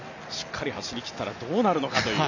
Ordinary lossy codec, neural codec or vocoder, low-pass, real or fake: none; none; 7.2 kHz; real